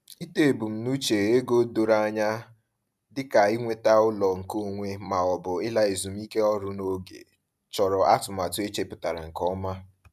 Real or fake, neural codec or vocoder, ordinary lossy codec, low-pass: real; none; none; 14.4 kHz